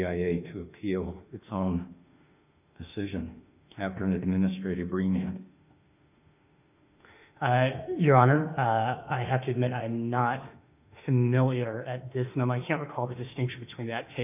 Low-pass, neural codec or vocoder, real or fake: 3.6 kHz; autoencoder, 48 kHz, 32 numbers a frame, DAC-VAE, trained on Japanese speech; fake